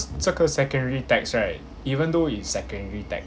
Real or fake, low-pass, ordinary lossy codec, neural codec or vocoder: real; none; none; none